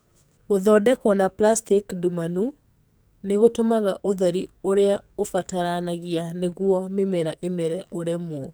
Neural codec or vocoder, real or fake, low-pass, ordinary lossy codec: codec, 44.1 kHz, 2.6 kbps, SNAC; fake; none; none